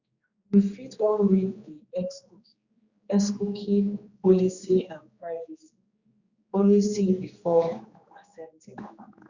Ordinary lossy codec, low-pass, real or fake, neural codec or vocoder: Opus, 64 kbps; 7.2 kHz; fake; codec, 16 kHz, 2 kbps, X-Codec, HuBERT features, trained on general audio